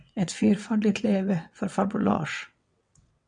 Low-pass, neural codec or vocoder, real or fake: 9.9 kHz; vocoder, 22.05 kHz, 80 mel bands, WaveNeXt; fake